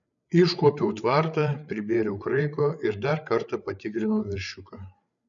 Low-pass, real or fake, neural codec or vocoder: 7.2 kHz; fake; codec, 16 kHz, 8 kbps, FreqCodec, larger model